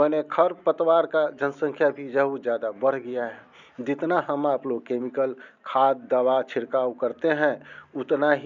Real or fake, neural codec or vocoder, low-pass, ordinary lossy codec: real; none; 7.2 kHz; none